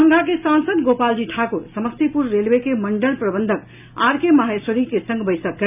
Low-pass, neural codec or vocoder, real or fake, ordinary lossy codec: 3.6 kHz; none; real; none